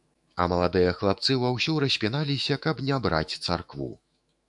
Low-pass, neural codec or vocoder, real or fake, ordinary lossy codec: 10.8 kHz; autoencoder, 48 kHz, 128 numbers a frame, DAC-VAE, trained on Japanese speech; fake; MP3, 96 kbps